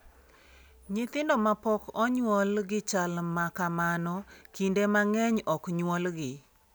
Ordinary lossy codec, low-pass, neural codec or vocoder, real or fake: none; none; none; real